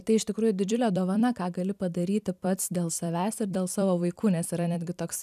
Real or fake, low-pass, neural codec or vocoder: fake; 14.4 kHz; vocoder, 44.1 kHz, 128 mel bands every 256 samples, BigVGAN v2